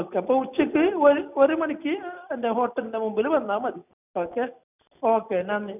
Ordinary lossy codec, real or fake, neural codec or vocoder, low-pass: none; real; none; 3.6 kHz